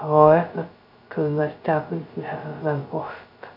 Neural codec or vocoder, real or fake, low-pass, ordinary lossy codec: codec, 16 kHz, 0.2 kbps, FocalCodec; fake; 5.4 kHz; none